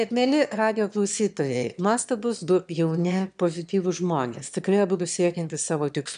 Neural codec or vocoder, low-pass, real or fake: autoencoder, 22.05 kHz, a latent of 192 numbers a frame, VITS, trained on one speaker; 9.9 kHz; fake